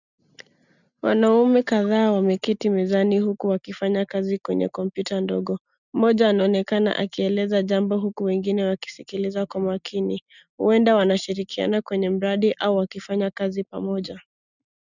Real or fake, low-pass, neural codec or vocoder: real; 7.2 kHz; none